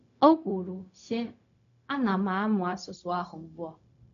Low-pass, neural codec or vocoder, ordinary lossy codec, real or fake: 7.2 kHz; codec, 16 kHz, 0.4 kbps, LongCat-Audio-Codec; none; fake